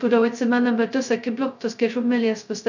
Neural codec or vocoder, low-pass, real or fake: codec, 16 kHz, 0.2 kbps, FocalCodec; 7.2 kHz; fake